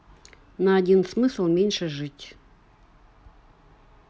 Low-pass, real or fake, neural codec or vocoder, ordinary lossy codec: none; real; none; none